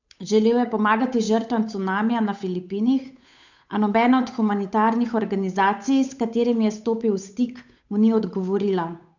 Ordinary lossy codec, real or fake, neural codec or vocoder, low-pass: none; fake; codec, 16 kHz, 8 kbps, FunCodec, trained on Chinese and English, 25 frames a second; 7.2 kHz